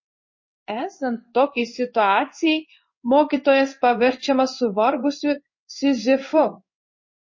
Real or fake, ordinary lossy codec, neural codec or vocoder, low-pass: fake; MP3, 32 kbps; codec, 16 kHz in and 24 kHz out, 1 kbps, XY-Tokenizer; 7.2 kHz